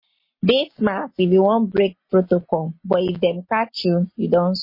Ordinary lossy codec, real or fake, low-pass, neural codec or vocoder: MP3, 24 kbps; real; 5.4 kHz; none